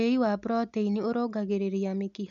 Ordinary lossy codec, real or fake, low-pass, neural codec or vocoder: none; real; 7.2 kHz; none